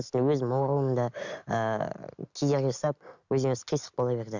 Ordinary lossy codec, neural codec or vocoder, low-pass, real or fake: none; none; 7.2 kHz; real